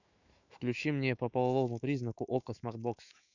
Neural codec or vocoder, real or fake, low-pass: autoencoder, 48 kHz, 128 numbers a frame, DAC-VAE, trained on Japanese speech; fake; 7.2 kHz